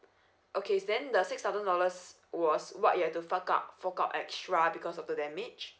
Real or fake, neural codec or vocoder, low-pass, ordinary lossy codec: real; none; none; none